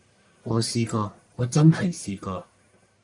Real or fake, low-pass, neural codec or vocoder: fake; 10.8 kHz; codec, 44.1 kHz, 1.7 kbps, Pupu-Codec